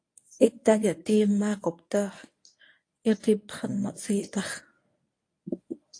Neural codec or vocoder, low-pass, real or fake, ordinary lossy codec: codec, 24 kHz, 0.9 kbps, WavTokenizer, medium speech release version 1; 9.9 kHz; fake; AAC, 48 kbps